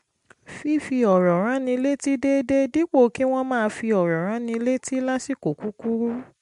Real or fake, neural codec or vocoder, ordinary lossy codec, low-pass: real; none; MP3, 64 kbps; 10.8 kHz